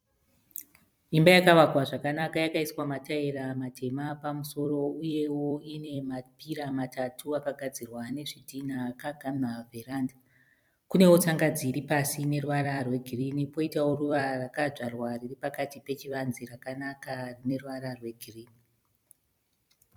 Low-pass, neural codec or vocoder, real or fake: 19.8 kHz; vocoder, 44.1 kHz, 128 mel bands every 256 samples, BigVGAN v2; fake